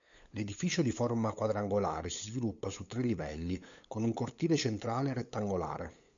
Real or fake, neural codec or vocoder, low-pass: fake; codec, 16 kHz, 8 kbps, FunCodec, trained on LibriTTS, 25 frames a second; 7.2 kHz